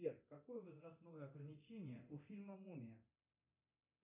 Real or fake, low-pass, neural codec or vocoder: fake; 3.6 kHz; codec, 24 kHz, 0.9 kbps, DualCodec